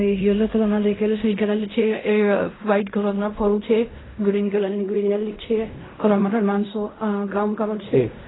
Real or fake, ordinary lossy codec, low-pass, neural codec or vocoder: fake; AAC, 16 kbps; 7.2 kHz; codec, 16 kHz in and 24 kHz out, 0.4 kbps, LongCat-Audio-Codec, fine tuned four codebook decoder